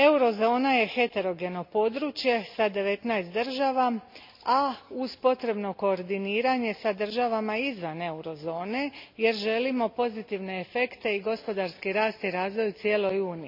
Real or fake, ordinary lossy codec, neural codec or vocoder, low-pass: real; MP3, 48 kbps; none; 5.4 kHz